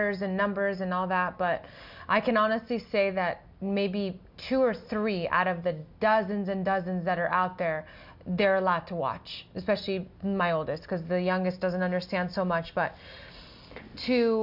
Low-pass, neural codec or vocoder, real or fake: 5.4 kHz; none; real